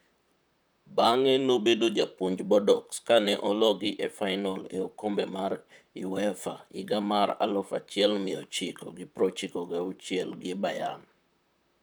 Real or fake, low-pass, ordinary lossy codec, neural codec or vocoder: fake; none; none; vocoder, 44.1 kHz, 128 mel bands, Pupu-Vocoder